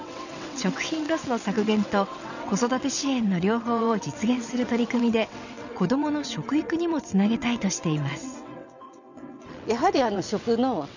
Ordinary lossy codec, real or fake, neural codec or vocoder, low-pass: none; fake; vocoder, 22.05 kHz, 80 mel bands, WaveNeXt; 7.2 kHz